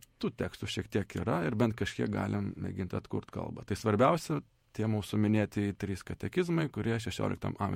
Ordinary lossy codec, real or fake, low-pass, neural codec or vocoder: MP3, 64 kbps; fake; 19.8 kHz; vocoder, 48 kHz, 128 mel bands, Vocos